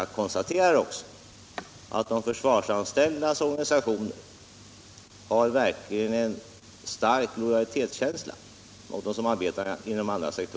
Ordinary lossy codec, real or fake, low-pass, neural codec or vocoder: none; real; none; none